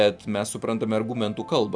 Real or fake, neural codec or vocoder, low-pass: real; none; 9.9 kHz